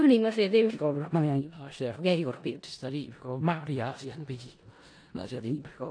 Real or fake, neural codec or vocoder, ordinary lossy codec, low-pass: fake; codec, 16 kHz in and 24 kHz out, 0.4 kbps, LongCat-Audio-Codec, four codebook decoder; AAC, 48 kbps; 9.9 kHz